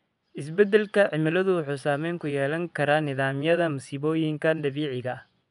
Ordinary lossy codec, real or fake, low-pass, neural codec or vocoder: none; fake; 10.8 kHz; vocoder, 24 kHz, 100 mel bands, Vocos